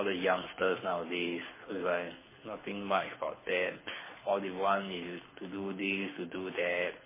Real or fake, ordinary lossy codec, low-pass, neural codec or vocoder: fake; MP3, 16 kbps; 3.6 kHz; codec, 24 kHz, 6 kbps, HILCodec